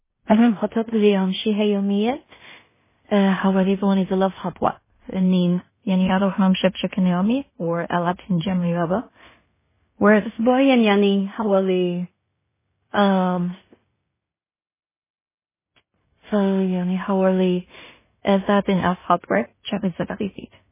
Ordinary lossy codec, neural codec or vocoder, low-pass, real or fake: MP3, 16 kbps; codec, 16 kHz in and 24 kHz out, 0.4 kbps, LongCat-Audio-Codec, two codebook decoder; 3.6 kHz; fake